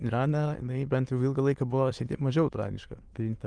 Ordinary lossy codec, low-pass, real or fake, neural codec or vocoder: Opus, 24 kbps; 9.9 kHz; fake; autoencoder, 22.05 kHz, a latent of 192 numbers a frame, VITS, trained on many speakers